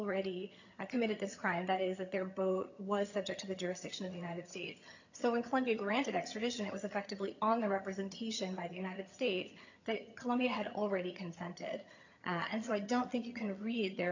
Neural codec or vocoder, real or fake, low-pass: vocoder, 22.05 kHz, 80 mel bands, HiFi-GAN; fake; 7.2 kHz